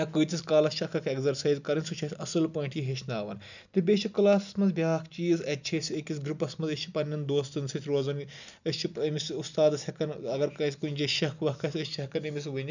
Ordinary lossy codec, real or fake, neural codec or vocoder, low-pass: none; real; none; 7.2 kHz